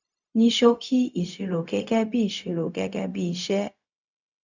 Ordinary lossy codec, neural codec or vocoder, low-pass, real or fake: none; codec, 16 kHz, 0.4 kbps, LongCat-Audio-Codec; 7.2 kHz; fake